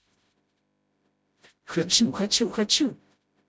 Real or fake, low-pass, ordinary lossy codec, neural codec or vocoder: fake; none; none; codec, 16 kHz, 0.5 kbps, FreqCodec, smaller model